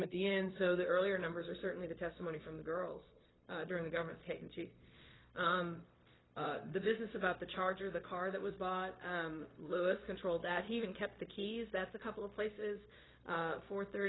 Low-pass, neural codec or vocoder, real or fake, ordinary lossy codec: 7.2 kHz; codec, 16 kHz, 0.4 kbps, LongCat-Audio-Codec; fake; AAC, 16 kbps